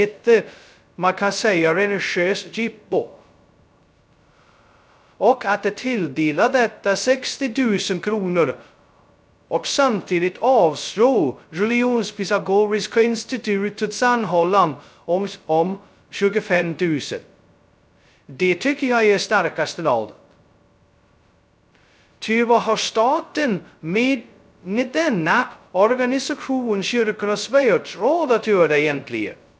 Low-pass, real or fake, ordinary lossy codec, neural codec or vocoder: none; fake; none; codec, 16 kHz, 0.2 kbps, FocalCodec